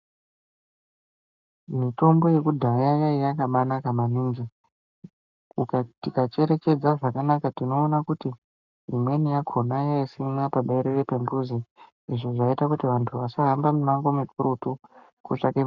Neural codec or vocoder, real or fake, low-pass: codec, 44.1 kHz, 7.8 kbps, Pupu-Codec; fake; 7.2 kHz